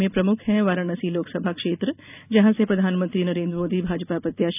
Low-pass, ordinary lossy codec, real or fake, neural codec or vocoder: 3.6 kHz; none; real; none